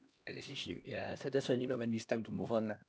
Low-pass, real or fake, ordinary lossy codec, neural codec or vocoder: none; fake; none; codec, 16 kHz, 1 kbps, X-Codec, HuBERT features, trained on LibriSpeech